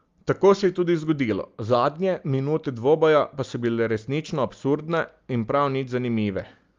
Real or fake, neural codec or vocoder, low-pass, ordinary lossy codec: real; none; 7.2 kHz; Opus, 32 kbps